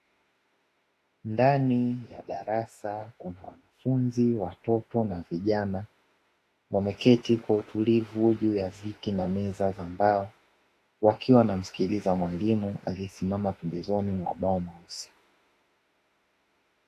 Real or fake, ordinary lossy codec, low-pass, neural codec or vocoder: fake; AAC, 48 kbps; 14.4 kHz; autoencoder, 48 kHz, 32 numbers a frame, DAC-VAE, trained on Japanese speech